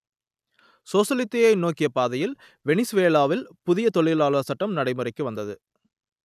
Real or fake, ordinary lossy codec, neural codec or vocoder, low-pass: real; none; none; 14.4 kHz